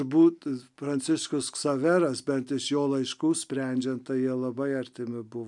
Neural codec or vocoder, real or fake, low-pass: none; real; 10.8 kHz